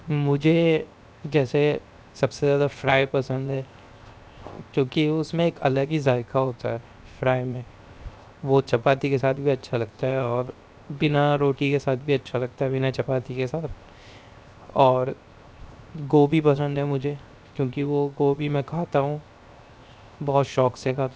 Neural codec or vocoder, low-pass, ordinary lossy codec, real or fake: codec, 16 kHz, 0.7 kbps, FocalCodec; none; none; fake